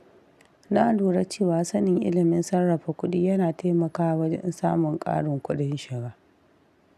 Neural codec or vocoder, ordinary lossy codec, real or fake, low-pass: none; none; real; 14.4 kHz